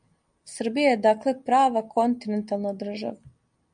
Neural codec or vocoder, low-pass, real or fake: none; 9.9 kHz; real